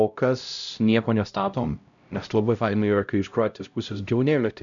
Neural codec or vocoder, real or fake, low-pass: codec, 16 kHz, 0.5 kbps, X-Codec, HuBERT features, trained on LibriSpeech; fake; 7.2 kHz